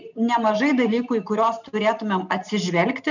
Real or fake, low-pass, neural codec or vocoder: real; 7.2 kHz; none